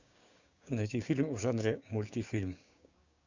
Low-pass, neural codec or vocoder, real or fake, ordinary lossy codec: 7.2 kHz; codec, 16 kHz, 6 kbps, DAC; fake; Opus, 64 kbps